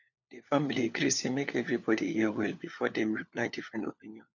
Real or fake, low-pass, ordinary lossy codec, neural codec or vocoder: fake; 7.2 kHz; none; codec, 16 kHz, 4 kbps, FunCodec, trained on LibriTTS, 50 frames a second